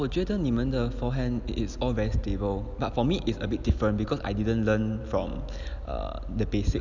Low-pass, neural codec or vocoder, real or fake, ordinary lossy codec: 7.2 kHz; none; real; none